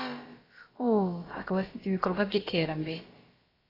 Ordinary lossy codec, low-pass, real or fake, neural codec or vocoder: AAC, 24 kbps; 5.4 kHz; fake; codec, 16 kHz, about 1 kbps, DyCAST, with the encoder's durations